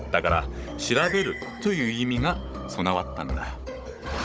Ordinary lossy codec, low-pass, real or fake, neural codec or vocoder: none; none; fake; codec, 16 kHz, 16 kbps, FunCodec, trained on Chinese and English, 50 frames a second